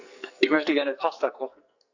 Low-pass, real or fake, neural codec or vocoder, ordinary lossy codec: 7.2 kHz; fake; codec, 44.1 kHz, 2.6 kbps, SNAC; AAC, 48 kbps